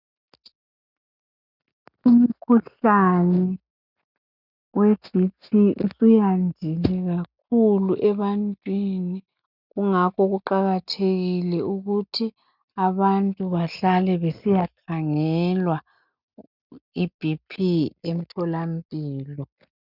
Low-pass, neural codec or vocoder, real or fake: 5.4 kHz; none; real